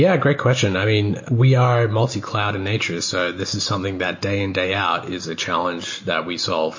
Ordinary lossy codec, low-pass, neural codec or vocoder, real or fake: MP3, 32 kbps; 7.2 kHz; none; real